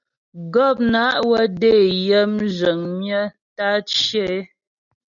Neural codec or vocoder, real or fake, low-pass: none; real; 7.2 kHz